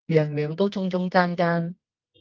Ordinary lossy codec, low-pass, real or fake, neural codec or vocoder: Opus, 32 kbps; 7.2 kHz; fake; codec, 24 kHz, 0.9 kbps, WavTokenizer, medium music audio release